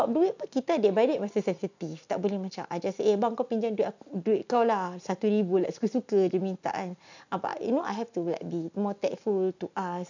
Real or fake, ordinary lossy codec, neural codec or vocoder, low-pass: real; none; none; 7.2 kHz